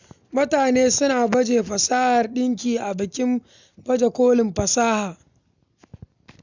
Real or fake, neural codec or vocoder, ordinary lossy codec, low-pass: real; none; none; 7.2 kHz